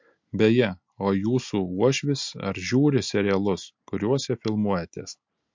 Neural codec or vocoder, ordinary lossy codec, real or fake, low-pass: none; MP3, 48 kbps; real; 7.2 kHz